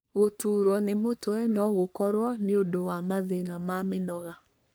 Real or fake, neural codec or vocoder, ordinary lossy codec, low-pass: fake; codec, 44.1 kHz, 3.4 kbps, Pupu-Codec; none; none